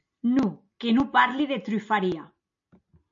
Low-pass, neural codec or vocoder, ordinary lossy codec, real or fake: 7.2 kHz; none; MP3, 48 kbps; real